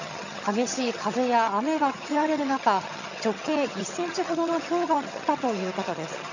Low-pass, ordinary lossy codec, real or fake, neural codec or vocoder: 7.2 kHz; none; fake; vocoder, 22.05 kHz, 80 mel bands, HiFi-GAN